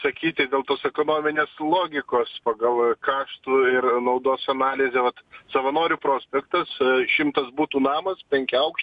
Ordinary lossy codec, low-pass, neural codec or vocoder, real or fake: MP3, 64 kbps; 10.8 kHz; none; real